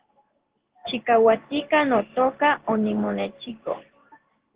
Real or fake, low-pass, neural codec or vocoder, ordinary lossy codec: fake; 3.6 kHz; codec, 16 kHz in and 24 kHz out, 1 kbps, XY-Tokenizer; Opus, 16 kbps